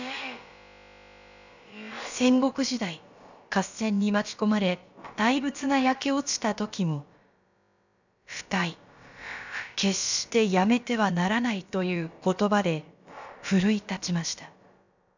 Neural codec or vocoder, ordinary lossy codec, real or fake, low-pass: codec, 16 kHz, about 1 kbps, DyCAST, with the encoder's durations; none; fake; 7.2 kHz